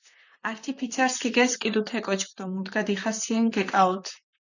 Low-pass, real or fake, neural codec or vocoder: 7.2 kHz; fake; vocoder, 44.1 kHz, 128 mel bands, Pupu-Vocoder